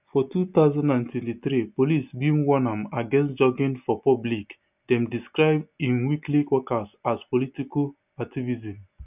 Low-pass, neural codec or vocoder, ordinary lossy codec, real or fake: 3.6 kHz; none; none; real